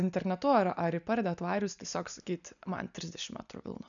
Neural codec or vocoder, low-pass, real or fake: none; 7.2 kHz; real